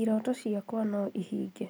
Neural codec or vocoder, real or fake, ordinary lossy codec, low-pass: none; real; none; none